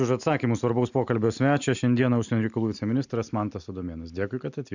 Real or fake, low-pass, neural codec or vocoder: real; 7.2 kHz; none